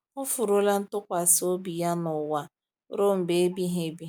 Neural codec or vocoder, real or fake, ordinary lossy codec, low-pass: none; real; none; none